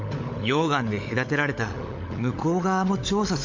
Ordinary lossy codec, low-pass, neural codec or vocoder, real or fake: MP3, 48 kbps; 7.2 kHz; codec, 16 kHz, 16 kbps, FunCodec, trained on LibriTTS, 50 frames a second; fake